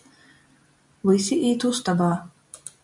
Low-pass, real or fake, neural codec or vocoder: 10.8 kHz; real; none